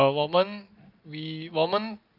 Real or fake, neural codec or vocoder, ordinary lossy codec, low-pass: real; none; none; 5.4 kHz